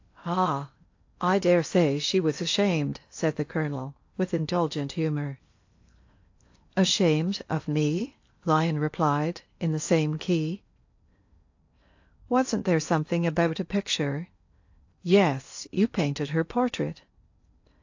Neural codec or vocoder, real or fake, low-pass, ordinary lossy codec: codec, 16 kHz in and 24 kHz out, 0.8 kbps, FocalCodec, streaming, 65536 codes; fake; 7.2 kHz; AAC, 48 kbps